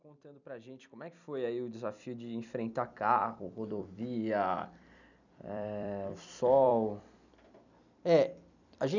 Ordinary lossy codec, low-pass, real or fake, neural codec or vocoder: none; 7.2 kHz; fake; vocoder, 44.1 kHz, 128 mel bands every 256 samples, BigVGAN v2